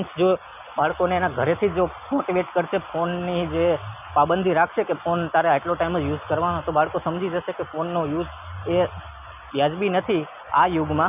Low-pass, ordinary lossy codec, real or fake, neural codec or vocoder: 3.6 kHz; none; real; none